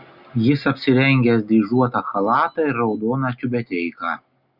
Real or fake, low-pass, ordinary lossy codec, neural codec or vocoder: real; 5.4 kHz; Opus, 64 kbps; none